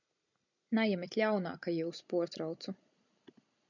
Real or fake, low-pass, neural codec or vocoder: real; 7.2 kHz; none